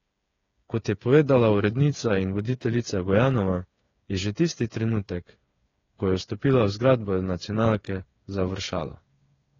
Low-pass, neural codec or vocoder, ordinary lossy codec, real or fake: 7.2 kHz; codec, 16 kHz, 8 kbps, FreqCodec, smaller model; AAC, 32 kbps; fake